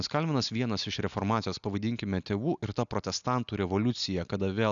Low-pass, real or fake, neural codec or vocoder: 7.2 kHz; real; none